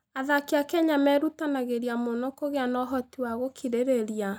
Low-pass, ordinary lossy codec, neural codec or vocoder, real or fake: 19.8 kHz; none; none; real